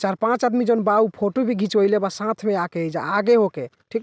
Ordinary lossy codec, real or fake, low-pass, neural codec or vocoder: none; real; none; none